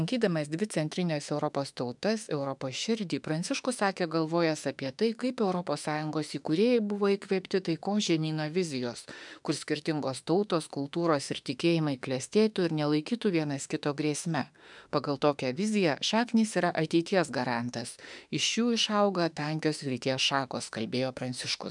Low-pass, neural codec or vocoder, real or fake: 10.8 kHz; autoencoder, 48 kHz, 32 numbers a frame, DAC-VAE, trained on Japanese speech; fake